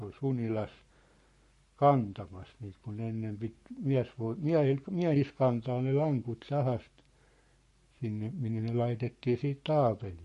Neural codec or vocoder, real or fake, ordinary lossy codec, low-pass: codec, 44.1 kHz, 7.8 kbps, Pupu-Codec; fake; MP3, 48 kbps; 14.4 kHz